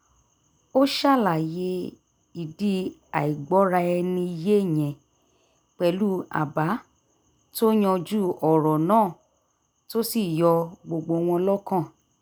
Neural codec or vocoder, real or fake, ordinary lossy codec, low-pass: none; real; none; none